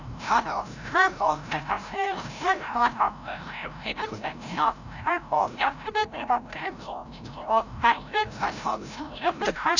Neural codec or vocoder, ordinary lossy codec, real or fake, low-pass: codec, 16 kHz, 0.5 kbps, FreqCodec, larger model; none; fake; 7.2 kHz